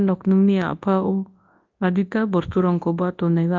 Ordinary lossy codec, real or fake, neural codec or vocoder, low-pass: Opus, 32 kbps; fake; codec, 24 kHz, 0.9 kbps, WavTokenizer, large speech release; 7.2 kHz